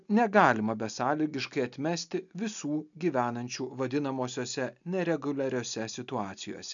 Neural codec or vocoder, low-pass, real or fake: none; 7.2 kHz; real